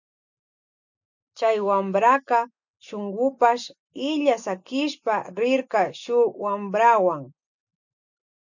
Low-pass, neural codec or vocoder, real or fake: 7.2 kHz; none; real